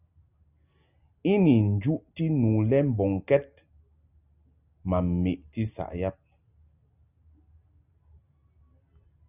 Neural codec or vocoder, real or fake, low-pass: none; real; 3.6 kHz